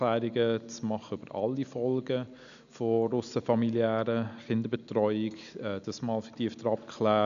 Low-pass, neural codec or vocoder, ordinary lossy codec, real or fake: 7.2 kHz; none; MP3, 96 kbps; real